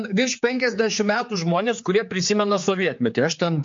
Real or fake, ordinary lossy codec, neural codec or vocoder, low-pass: fake; MP3, 48 kbps; codec, 16 kHz, 4 kbps, X-Codec, HuBERT features, trained on general audio; 7.2 kHz